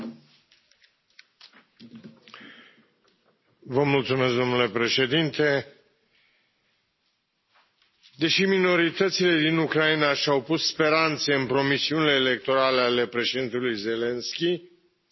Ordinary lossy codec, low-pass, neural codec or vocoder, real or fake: MP3, 24 kbps; 7.2 kHz; none; real